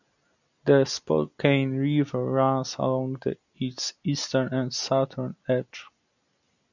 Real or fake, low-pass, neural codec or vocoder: real; 7.2 kHz; none